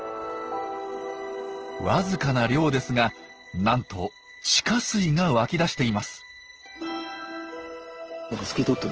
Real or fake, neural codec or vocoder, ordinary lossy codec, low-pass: real; none; Opus, 16 kbps; 7.2 kHz